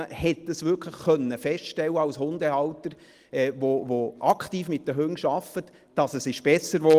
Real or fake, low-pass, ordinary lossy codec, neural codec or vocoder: real; 14.4 kHz; Opus, 24 kbps; none